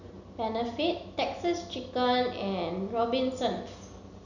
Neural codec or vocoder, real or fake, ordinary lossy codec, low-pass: none; real; none; 7.2 kHz